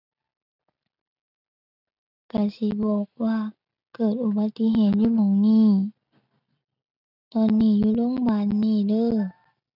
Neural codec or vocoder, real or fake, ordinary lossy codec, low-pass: none; real; none; 5.4 kHz